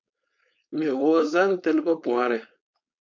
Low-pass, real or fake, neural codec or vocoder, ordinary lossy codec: 7.2 kHz; fake; codec, 16 kHz, 4.8 kbps, FACodec; MP3, 64 kbps